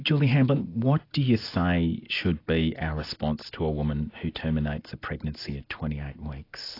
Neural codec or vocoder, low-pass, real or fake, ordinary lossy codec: none; 5.4 kHz; real; AAC, 32 kbps